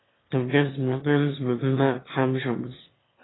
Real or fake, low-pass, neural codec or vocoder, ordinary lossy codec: fake; 7.2 kHz; autoencoder, 22.05 kHz, a latent of 192 numbers a frame, VITS, trained on one speaker; AAC, 16 kbps